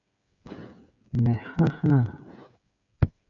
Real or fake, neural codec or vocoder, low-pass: fake; codec, 16 kHz, 8 kbps, FreqCodec, smaller model; 7.2 kHz